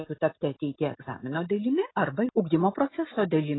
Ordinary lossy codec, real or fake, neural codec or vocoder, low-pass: AAC, 16 kbps; real; none; 7.2 kHz